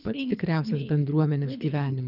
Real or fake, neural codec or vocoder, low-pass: fake; codec, 24 kHz, 3 kbps, HILCodec; 5.4 kHz